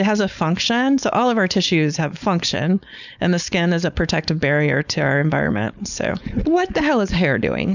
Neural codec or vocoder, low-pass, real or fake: codec, 16 kHz, 4.8 kbps, FACodec; 7.2 kHz; fake